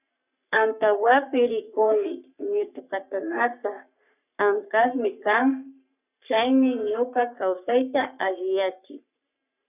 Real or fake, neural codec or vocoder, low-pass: fake; codec, 44.1 kHz, 3.4 kbps, Pupu-Codec; 3.6 kHz